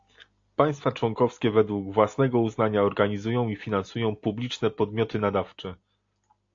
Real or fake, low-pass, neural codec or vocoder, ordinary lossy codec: real; 7.2 kHz; none; AAC, 48 kbps